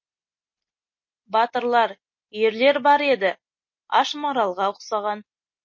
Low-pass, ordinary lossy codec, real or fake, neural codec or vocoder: 7.2 kHz; MP3, 32 kbps; real; none